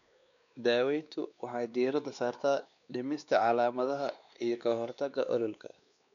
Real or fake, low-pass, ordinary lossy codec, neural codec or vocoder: fake; 7.2 kHz; none; codec, 16 kHz, 2 kbps, X-Codec, WavLM features, trained on Multilingual LibriSpeech